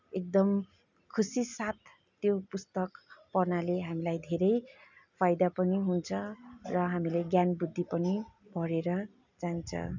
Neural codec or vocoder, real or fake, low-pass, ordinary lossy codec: none; real; 7.2 kHz; none